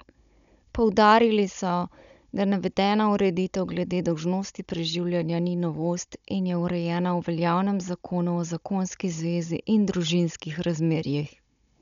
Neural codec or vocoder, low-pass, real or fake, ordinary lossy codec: codec, 16 kHz, 16 kbps, FunCodec, trained on Chinese and English, 50 frames a second; 7.2 kHz; fake; none